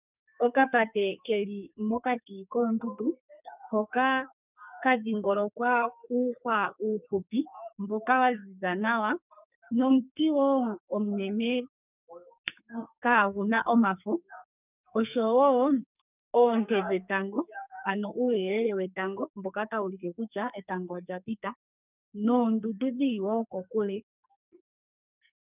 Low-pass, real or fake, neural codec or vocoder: 3.6 kHz; fake; codec, 44.1 kHz, 2.6 kbps, SNAC